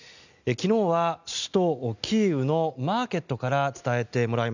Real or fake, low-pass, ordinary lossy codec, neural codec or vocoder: real; 7.2 kHz; none; none